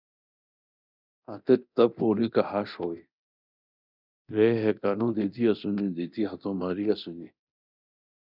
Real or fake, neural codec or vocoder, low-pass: fake; codec, 24 kHz, 0.9 kbps, DualCodec; 5.4 kHz